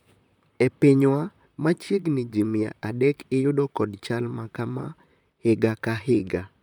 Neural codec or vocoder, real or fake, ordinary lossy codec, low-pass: vocoder, 44.1 kHz, 128 mel bands, Pupu-Vocoder; fake; none; 19.8 kHz